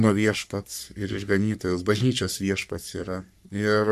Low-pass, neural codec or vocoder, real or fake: 14.4 kHz; codec, 44.1 kHz, 3.4 kbps, Pupu-Codec; fake